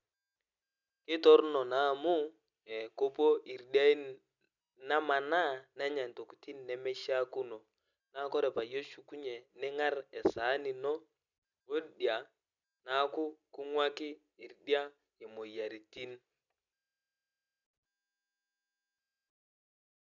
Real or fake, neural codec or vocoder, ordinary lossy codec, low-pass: real; none; none; 7.2 kHz